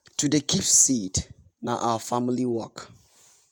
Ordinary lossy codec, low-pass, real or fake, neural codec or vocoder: none; none; fake; vocoder, 48 kHz, 128 mel bands, Vocos